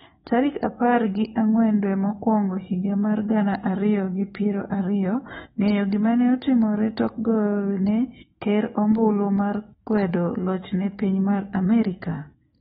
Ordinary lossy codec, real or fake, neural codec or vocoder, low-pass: AAC, 16 kbps; fake; autoencoder, 48 kHz, 128 numbers a frame, DAC-VAE, trained on Japanese speech; 19.8 kHz